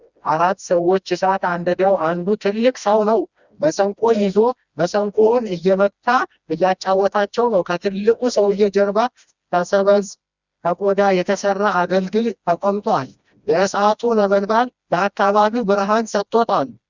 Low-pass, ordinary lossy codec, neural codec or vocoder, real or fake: 7.2 kHz; Opus, 64 kbps; codec, 16 kHz, 1 kbps, FreqCodec, smaller model; fake